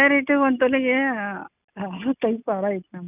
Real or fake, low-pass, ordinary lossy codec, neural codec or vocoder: real; 3.6 kHz; none; none